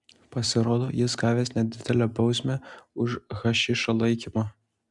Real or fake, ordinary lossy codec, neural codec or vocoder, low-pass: real; MP3, 96 kbps; none; 10.8 kHz